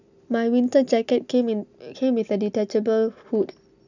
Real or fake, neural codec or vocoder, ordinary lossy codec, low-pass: real; none; none; 7.2 kHz